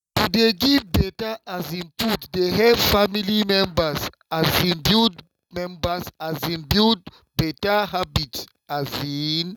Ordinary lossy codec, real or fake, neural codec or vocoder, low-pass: none; real; none; 19.8 kHz